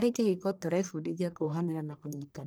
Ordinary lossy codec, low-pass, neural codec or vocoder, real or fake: none; none; codec, 44.1 kHz, 1.7 kbps, Pupu-Codec; fake